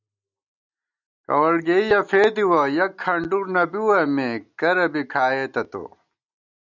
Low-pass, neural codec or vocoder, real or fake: 7.2 kHz; none; real